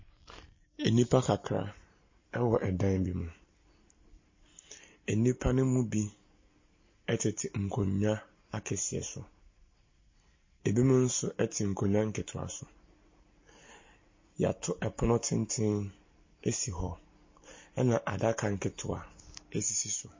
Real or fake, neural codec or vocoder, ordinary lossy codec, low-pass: real; none; MP3, 32 kbps; 7.2 kHz